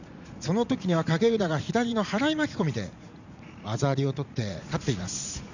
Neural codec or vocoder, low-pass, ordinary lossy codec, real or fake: vocoder, 44.1 kHz, 80 mel bands, Vocos; 7.2 kHz; none; fake